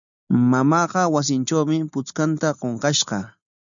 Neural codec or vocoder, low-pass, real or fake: none; 7.2 kHz; real